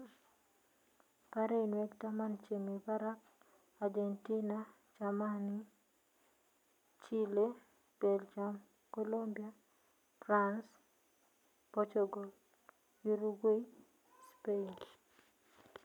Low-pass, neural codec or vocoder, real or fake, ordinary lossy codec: 19.8 kHz; none; real; none